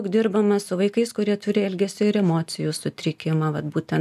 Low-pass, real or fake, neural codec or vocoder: 14.4 kHz; real; none